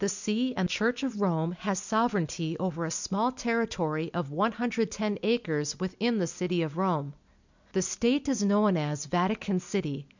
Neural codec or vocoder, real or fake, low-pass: none; real; 7.2 kHz